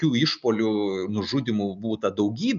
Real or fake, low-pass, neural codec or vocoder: real; 7.2 kHz; none